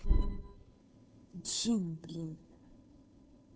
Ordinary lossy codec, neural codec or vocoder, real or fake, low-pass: none; codec, 16 kHz, 2 kbps, FunCodec, trained on Chinese and English, 25 frames a second; fake; none